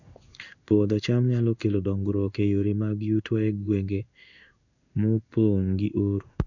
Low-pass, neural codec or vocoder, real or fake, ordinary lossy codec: 7.2 kHz; codec, 16 kHz in and 24 kHz out, 1 kbps, XY-Tokenizer; fake; none